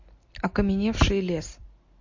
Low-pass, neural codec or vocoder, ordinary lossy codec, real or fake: 7.2 kHz; none; MP3, 48 kbps; real